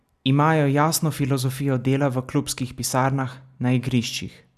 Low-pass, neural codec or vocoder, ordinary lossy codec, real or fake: 14.4 kHz; none; none; real